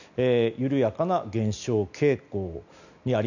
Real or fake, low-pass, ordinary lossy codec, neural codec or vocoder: real; 7.2 kHz; none; none